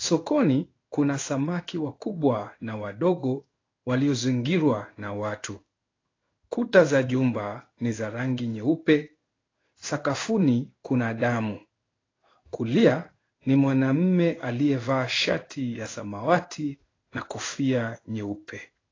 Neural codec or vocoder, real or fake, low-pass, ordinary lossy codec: codec, 16 kHz in and 24 kHz out, 1 kbps, XY-Tokenizer; fake; 7.2 kHz; AAC, 32 kbps